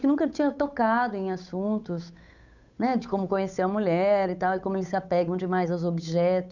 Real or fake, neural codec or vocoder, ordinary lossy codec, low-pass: fake; codec, 16 kHz, 8 kbps, FunCodec, trained on Chinese and English, 25 frames a second; none; 7.2 kHz